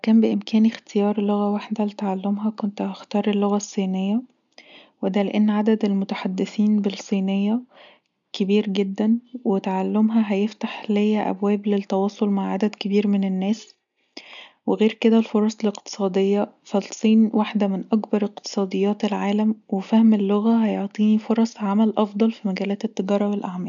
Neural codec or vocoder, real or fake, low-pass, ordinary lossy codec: none; real; 7.2 kHz; none